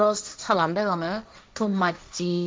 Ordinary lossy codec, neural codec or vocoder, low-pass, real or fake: none; codec, 16 kHz, 1.1 kbps, Voila-Tokenizer; none; fake